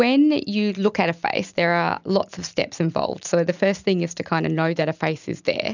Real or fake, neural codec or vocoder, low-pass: real; none; 7.2 kHz